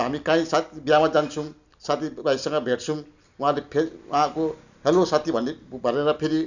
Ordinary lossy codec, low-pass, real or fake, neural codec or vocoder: none; 7.2 kHz; real; none